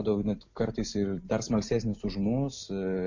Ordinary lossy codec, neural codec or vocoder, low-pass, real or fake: MP3, 48 kbps; none; 7.2 kHz; real